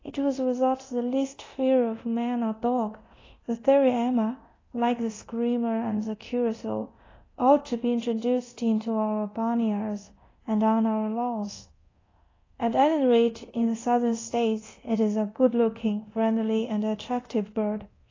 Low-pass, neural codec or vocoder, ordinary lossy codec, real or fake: 7.2 kHz; codec, 24 kHz, 0.9 kbps, DualCodec; AAC, 32 kbps; fake